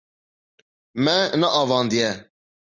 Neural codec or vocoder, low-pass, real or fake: none; 7.2 kHz; real